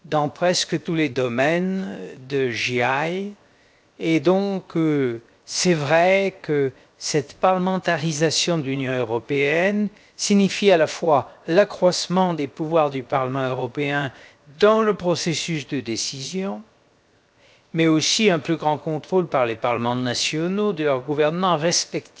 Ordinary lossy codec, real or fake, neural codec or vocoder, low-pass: none; fake; codec, 16 kHz, 0.7 kbps, FocalCodec; none